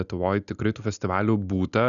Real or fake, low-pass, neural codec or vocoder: real; 7.2 kHz; none